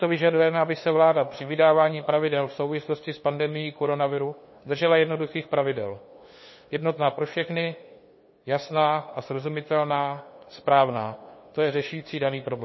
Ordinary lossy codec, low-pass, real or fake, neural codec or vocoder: MP3, 24 kbps; 7.2 kHz; fake; codec, 16 kHz, 2 kbps, FunCodec, trained on LibriTTS, 25 frames a second